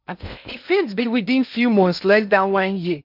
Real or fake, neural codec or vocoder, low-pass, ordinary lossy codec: fake; codec, 16 kHz in and 24 kHz out, 0.6 kbps, FocalCodec, streaming, 2048 codes; 5.4 kHz; none